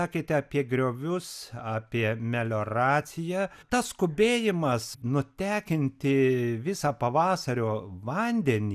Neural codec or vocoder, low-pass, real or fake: none; 14.4 kHz; real